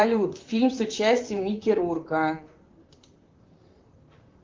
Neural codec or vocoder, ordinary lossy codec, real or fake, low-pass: vocoder, 44.1 kHz, 128 mel bands, Pupu-Vocoder; Opus, 32 kbps; fake; 7.2 kHz